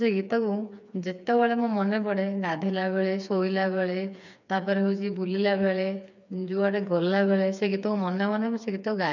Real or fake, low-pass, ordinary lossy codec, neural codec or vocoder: fake; 7.2 kHz; none; codec, 16 kHz, 4 kbps, FreqCodec, smaller model